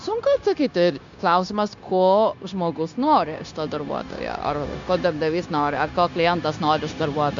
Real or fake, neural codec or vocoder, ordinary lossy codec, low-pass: fake; codec, 16 kHz, 0.9 kbps, LongCat-Audio-Codec; MP3, 64 kbps; 7.2 kHz